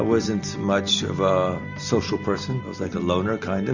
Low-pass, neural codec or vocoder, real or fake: 7.2 kHz; none; real